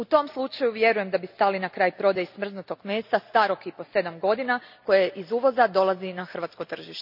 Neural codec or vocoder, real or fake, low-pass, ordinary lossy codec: none; real; 5.4 kHz; none